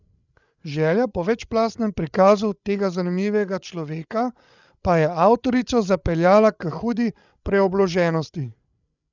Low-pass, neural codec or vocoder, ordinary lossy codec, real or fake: 7.2 kHz; codec, 16 kHz, 8 kbps, FreqCodec, larger model; none; fake